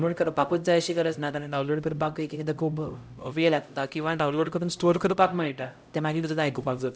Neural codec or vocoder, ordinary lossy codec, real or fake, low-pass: codec, 16 kHz, 0.5 kbps, X-Codec, HuBERT features, trained on LibriSpeech; none; fake; none